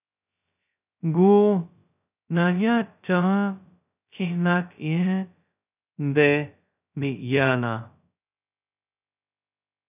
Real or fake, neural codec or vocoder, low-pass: fake; codec, 16 kHz, 0.2 kbps, FocalCodec; 3.6 kHz